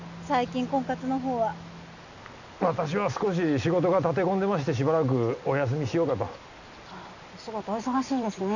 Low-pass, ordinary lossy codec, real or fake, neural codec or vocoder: 7.2 kHz; none; real; none